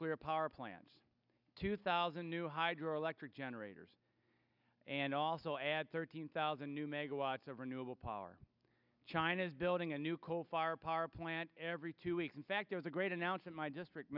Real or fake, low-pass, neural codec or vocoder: real; 5.4 kHz; none